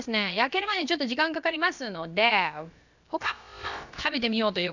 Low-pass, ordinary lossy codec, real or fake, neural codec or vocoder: 7.2 kHz; none; fake; codec, 16 kHz, about 1 kbps, DyCAST, with the encoder's durations